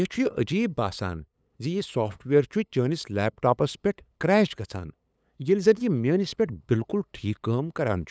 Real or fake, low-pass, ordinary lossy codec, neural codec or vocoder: fake; none; none; codec, 16 kHz, 8 kbps, FunCodec, trained on LibriTTS, 25 frames a second